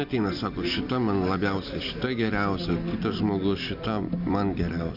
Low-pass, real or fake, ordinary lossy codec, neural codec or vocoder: 5.4 kHz; real; AAC, 48 kbps; none